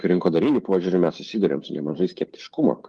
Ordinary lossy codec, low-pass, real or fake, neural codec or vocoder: Opus, 24 kbps; 9.9 kHz; fake; vocoder, 44.1 kHz, 128 mel bands every 512 samples, BigVGAN v2